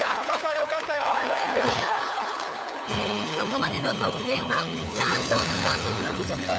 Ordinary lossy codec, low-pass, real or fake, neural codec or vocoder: none; none; fake; codec, 16 kHz, 4 kbps, FunCodec, trained on LibriTTS, 50 frames a second